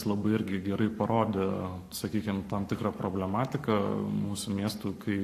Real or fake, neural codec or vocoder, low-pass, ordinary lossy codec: fake; codec, 44.1 kHz, 7.8 kbps, Pupu-Codec; 14.4 kHz; AAC, 64 kbps